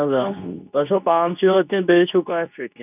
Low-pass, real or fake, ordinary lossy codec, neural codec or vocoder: 3.6 kHz; fake; none; codec, 24 kHz, 0.9 kbps, WavTokenizer, medium speech release version 2